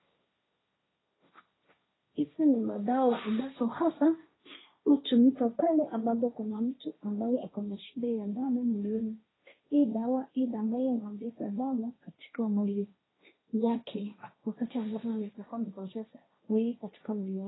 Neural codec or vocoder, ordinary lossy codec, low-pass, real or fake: codec, 16 kHz, 1.1 kbps, Voila-Tokenizer; AAC, 16 kbps; 7.2 kHz; fake